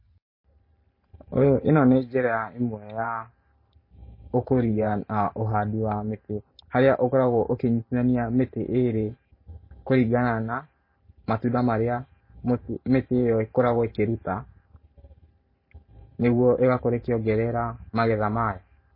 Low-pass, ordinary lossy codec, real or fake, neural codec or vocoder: 5.4 kHz; MP3, 24 kbps; fake; vocoder, 44.1 kHz, 128 mel bands every 512 samples, BigVGAN v2